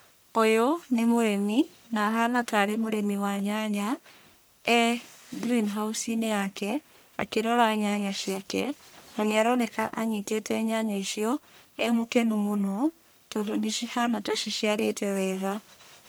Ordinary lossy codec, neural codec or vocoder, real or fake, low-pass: none; codec, 44.1 kHz, 1.7 kbps, Pupu-Codec; fake; none